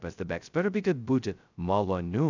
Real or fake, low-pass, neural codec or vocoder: fake; 7.2 kHz; codec, 16 kHz, 0.2 kbps, FocalCodec